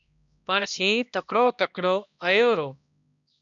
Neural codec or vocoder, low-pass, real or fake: codec, 16 kHz, 1 kbps, X-Codec, HuBERT features, trained on balanced general audio; 7.2 kHz; fake